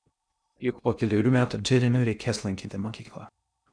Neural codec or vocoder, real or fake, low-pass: codec, 16 kHz in and 24 kHz out, 0.6 kbps, FocalCodec, streaming, 2048 codes; fake; 9.9 kHz